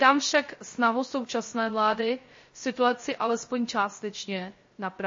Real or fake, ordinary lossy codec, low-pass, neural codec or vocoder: fake; MP3, 32 kbps; 7.2 kHz; codec, 16 kHz, 0.3 kbps, FocalCodec